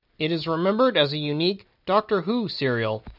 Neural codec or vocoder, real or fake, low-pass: none; real; 5.4 kHz